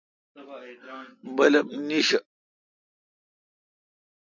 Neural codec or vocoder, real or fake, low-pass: none; real; 7.2 kHz